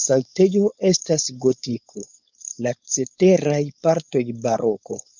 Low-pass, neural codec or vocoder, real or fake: 7.2 kHz; codec, 16 kHz, 4.8 kbps, FACodec; fake